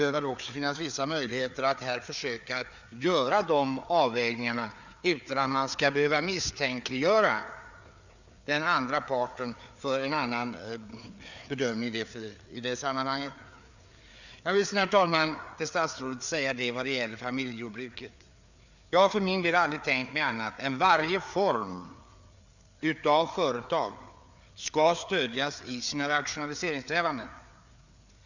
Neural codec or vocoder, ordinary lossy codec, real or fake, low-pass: codec, 16 kHz, 4 kbps, FreqCodec, larger model; none; fake; 7.2 kHz